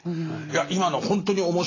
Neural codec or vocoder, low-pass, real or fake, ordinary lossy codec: none; 7.2 kHz; real; AAC, 32 kbps